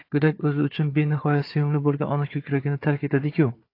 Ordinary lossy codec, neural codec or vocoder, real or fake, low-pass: AAC, 32 kbps; codec, 16 kHz, 4 kbps, FunCodec, trained on Chinese and English, 50 frames a second; fake; 5.4 kHz